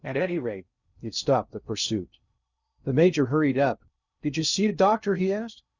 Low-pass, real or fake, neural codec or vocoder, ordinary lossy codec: 7.2 kHz; fake; codec, 16 kHz in and 24 kHz out, 0.6 kbps, FocalCodec, streaming, 2048 codes; Opus, 64 kbps